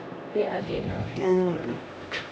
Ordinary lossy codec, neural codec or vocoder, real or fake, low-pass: none; codec, 16 kHz, 1 kbps, X-Codec, HuBERT features, trained on LibriSpeech; fake; none